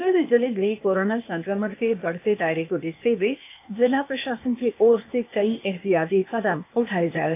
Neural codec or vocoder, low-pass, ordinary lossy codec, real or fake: codec, 16 kHz, 0.8 kbps, ZipCodec; 3.6 kHz; AAC, 32 kbps; fake